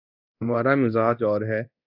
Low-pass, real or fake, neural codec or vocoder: 5.4 kHz; fake; codec, 24 kHz, 0.9 kbps, DualCodec